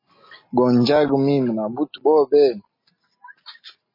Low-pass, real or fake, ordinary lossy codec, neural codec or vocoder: 5.4 kHz; real; MP3, 24 kbps; none